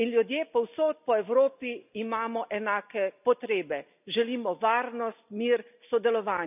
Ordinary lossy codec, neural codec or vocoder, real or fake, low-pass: none; none; real; 3.6 kHz